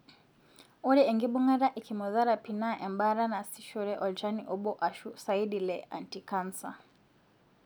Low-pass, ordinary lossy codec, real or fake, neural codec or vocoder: none; none; real; none